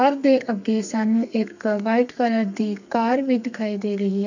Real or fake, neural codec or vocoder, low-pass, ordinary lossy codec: fake; codec, 32 kHz, 1.9 kbps, SNAC; 7.2 kHz; none